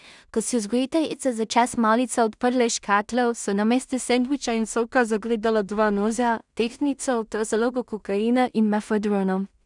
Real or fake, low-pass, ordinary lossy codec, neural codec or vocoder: fake; 10.8 kHz; none; codec, 16 kHz in and 24 kHz out, 0.4 kbps, LongCat-Audio-Codec, two codebook decoder